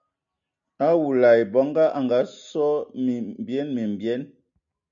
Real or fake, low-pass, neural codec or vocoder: real; 7.2 kHz; none